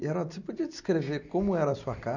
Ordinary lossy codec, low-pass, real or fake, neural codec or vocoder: none; 7.2 kHz; real; none